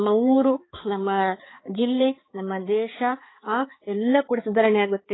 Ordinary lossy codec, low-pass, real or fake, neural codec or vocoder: AAC, 16 kbps; 7.2 kHz; fake; codec, 16 kHz, 4 kbps, X-Codec, HuBERT features, trained on balanced general audio